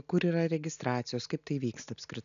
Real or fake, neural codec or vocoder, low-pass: real; none; 7.2 kHz